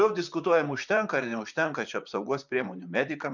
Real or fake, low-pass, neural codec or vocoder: fake; 7.2 kHz; vocoder, 44.1 kHz, 128 mel bands, Pupu-Vocoder